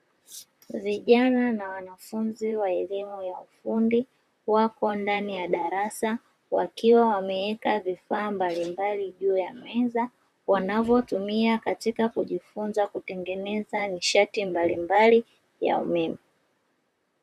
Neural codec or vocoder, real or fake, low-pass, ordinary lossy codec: vocoder, 44.1 kHz, 128 mel bands, Pupu-Vocoder; fake; 14.4 kHz; MP3, 96 kbps